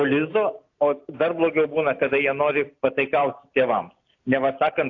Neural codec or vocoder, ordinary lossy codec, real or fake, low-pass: none; AAC, 48 kbps; real; 7.2 kHz